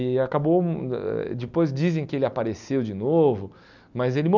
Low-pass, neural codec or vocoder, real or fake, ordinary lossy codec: 7.2 kHz; none; real; none